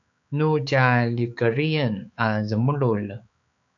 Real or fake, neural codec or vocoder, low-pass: fake; codec, 16 kHz, 4 kbps, X-Codec, HuBERT features, trained on balanced general audio; 7.2 kHz